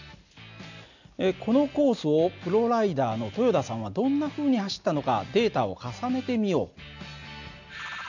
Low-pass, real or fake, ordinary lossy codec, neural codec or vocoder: 7.2 kHz; real; none; none